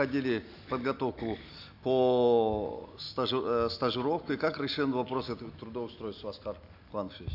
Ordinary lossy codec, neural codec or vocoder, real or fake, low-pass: AAC, 48 kbps; none; real; 5.4 kHz